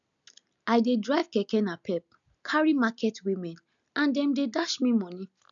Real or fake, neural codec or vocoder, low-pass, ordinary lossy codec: real; none; 7.2 kHz; none